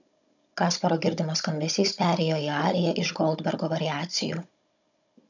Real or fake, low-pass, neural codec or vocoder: fake; 7.2 kHz; codec, 16 kHz, 16 kbps, FunCodec, trained on Chinese and English, 50 frames a second